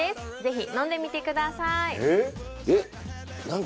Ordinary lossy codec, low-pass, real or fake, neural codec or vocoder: none; none; real; none